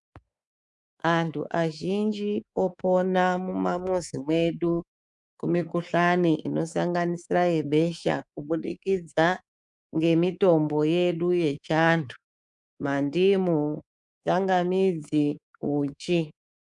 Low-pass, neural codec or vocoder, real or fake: 10.8 kHz; codec, 24 kHz, 3.1 kbps, DualCodec; fake